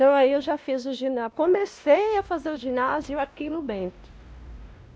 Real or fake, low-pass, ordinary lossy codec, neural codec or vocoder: fake; none; none; codec, 16 kHz, 1 kbps, X-Codec, WavLM features, trained on Multilingual LibriSpeech